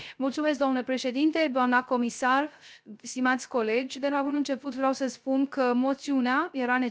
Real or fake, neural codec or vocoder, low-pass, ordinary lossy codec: fake; codec, 16 kHz, 0.3 kbps, FocalCodec; none; none